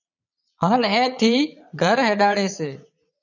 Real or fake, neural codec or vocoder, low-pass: fake; vocoder, 22.05 kHz, 80 mel bands, Vocos; 7.2 kHz